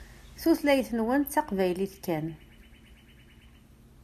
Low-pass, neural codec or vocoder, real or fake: 14.4 kHz; none; real